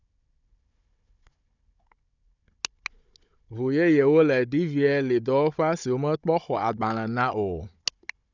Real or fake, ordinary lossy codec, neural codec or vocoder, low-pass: fake; none; codec, 16 kHz, 16 kbps, FunCodec, trained on Chinese and English, 50 frames a second; 7.2 kHz